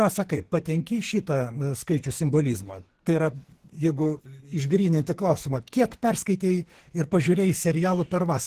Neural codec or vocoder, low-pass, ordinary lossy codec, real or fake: codec, 44.1 kHz, 2.6 kbps, SNAC; 14.4 kHz; Opus, 16 kbps; fake